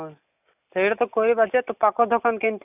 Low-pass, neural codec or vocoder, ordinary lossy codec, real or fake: 3.6 kHz; none; none; real